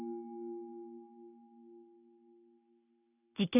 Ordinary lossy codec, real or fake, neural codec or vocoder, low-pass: none; real; none; 3.6 kHz